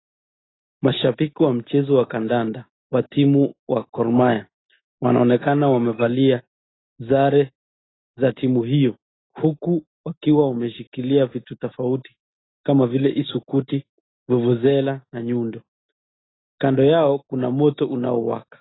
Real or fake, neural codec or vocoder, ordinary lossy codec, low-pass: real; none; AAC, 16 kbps; 7.2 kHz